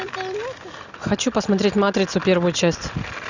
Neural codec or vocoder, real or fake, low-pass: none; real; 7.2 kHz